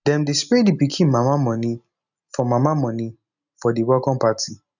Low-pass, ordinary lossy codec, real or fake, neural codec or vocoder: 7.2 kHz; none; real; none